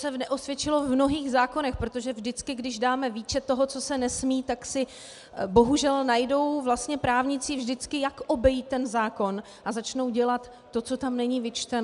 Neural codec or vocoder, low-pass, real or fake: none; 10.8 kHz; real